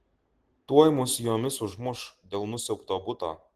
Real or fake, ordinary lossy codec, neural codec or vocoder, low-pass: real; Opus, 16 kbps; none; 14.4 kHz